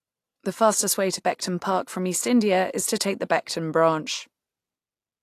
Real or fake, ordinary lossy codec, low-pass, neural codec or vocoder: real; AAC, 64 kbps; 14.4 kHz; none